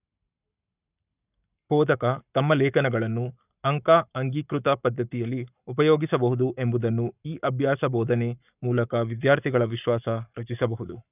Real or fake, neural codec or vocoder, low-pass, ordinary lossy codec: fake; codec, 44.1 kHz, 7.8 kbps, Pupu-Codec; 3.6 kHz; none